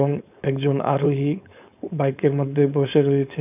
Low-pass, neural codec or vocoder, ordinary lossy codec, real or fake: 3.6 kHz; codec, 16 kHz, 4.8 kbps, FACodec; none; fake